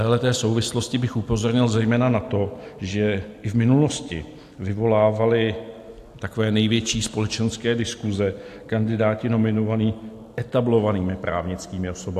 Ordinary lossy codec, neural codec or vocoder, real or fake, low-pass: AAC, 64 kbps; none; real; 14.4 kHz